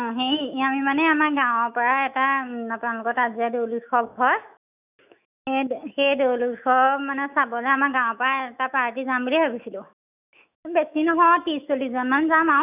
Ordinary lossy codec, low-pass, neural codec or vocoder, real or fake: none; 3.6 kHz; autoencoder, 48 kHz, 128 numbers a frame, DAC-VAE, trained on Japanese speech; fake